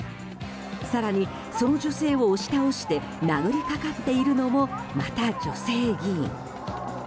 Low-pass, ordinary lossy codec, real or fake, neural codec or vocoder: none; none; real; none